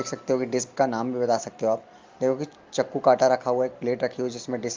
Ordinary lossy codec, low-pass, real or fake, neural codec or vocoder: Opus, 32 kbps; 7.2 kHz; real; none